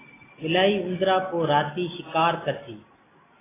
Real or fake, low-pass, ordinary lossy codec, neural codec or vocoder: real; 3.6 kHz; AAC, 16 kbps; none